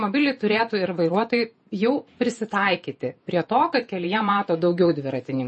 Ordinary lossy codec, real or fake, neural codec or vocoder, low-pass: MP3, 32 kbps; fake; vocoder, 48 kHz, 128 mel bands, Vocos; 10.8 kHz